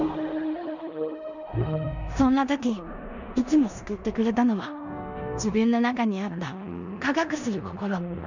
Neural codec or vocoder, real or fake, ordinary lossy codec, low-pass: codec, 16 kHz in and 24 kHz out, 0.9 kbps, LongCat-Audio-Codec, four codebook decoder; fake; none; 7.2 kHz